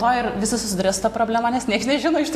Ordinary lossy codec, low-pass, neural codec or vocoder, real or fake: AAC, 64 kbps; 14.4 kHz; none; real